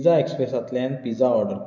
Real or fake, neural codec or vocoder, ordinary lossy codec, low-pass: real; none; none; 7.2 kHz